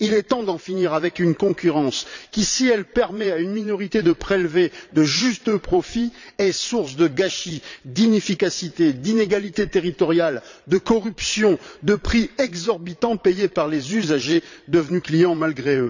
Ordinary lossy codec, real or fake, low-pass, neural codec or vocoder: none; fake; 7.2 kHz; vocoder, 22.05 kHz, 80 mel bands, Vocos